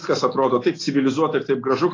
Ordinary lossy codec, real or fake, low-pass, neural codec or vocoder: AAC, 32 kbps; real; 7.2 kHz; none